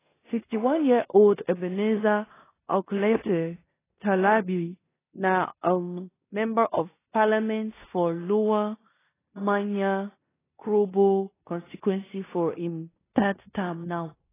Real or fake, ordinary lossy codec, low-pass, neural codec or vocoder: fake; AAC, 16 kbps; 3.6 kHz; codec, 16 kHz in and 24 kHz out, 0.9 kbps, LongCat-Audio-Codec, four codebook decoder